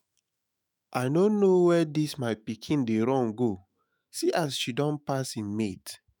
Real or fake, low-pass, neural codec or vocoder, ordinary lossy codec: fake; none; autoencoder, 48 kHz, 128 numbers a frame, DAC-VAE, trained on Japanese speech; none